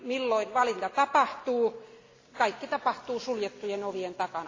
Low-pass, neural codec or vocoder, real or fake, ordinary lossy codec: 7.2 kHz; none; real; AAC, 32 kbps